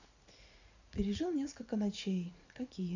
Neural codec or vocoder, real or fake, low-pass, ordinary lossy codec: vocoder, 44.1 kHz, 80 mel bands, Vocos; fake; 7.2 kHz; none